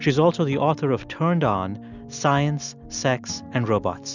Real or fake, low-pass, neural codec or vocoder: real; 7.2 kHz; none